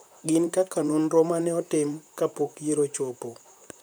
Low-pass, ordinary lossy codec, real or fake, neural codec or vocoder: none; none; fake; vocoder, 44.1 kHz, 128 mel bands, Pupu-Vocoder